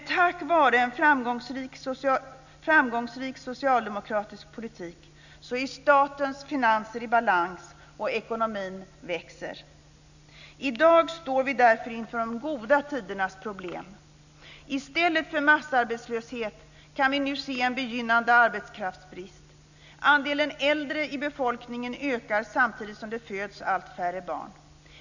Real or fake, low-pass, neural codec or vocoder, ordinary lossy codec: real; 7.2 kHz; none; none